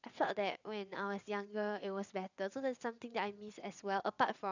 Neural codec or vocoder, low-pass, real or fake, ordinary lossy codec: vocoder, 22.05 kHz, 80 mel bands, WaveNeXt; 7.2 kHz; fake; none